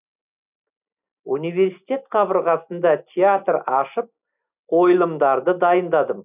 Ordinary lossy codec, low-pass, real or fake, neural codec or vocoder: none; 3.6 kHz; real; none